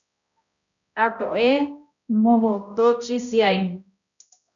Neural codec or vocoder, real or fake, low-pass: codec, 16 kHz, 0.5 kbps, X-Codec, HuBERT features, trained on balanced general audio; fake; 7.2 kHz